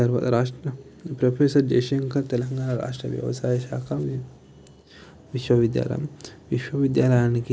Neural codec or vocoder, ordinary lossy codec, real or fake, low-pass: none; none; real; none